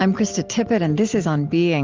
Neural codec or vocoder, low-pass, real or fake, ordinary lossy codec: none; 7.2 kHz; real; Opus, 32 kbps